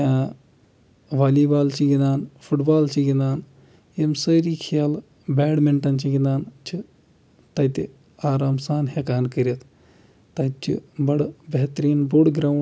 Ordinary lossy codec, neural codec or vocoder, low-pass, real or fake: none; none; none; real